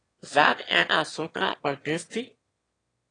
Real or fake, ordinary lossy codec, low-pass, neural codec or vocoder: fake; AAC, 32 kbps; 9.9 kHz; autoencoder, 22.05 kHz, a latent of 192 numbers a frame, VITS, trained on one speaker